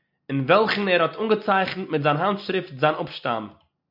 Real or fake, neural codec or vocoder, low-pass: real; none; 5.4 kHz